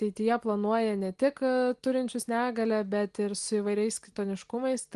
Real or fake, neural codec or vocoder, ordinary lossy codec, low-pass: real; none; Opus, 24 kbps; 10.8 kHz